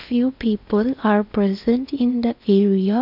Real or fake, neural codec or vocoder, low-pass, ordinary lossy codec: fake; codec, 16 kHz in and 24 kHz out, 0.8 kbps, FocalCodec, streaming, 65536 codes; 5.4 kHz; none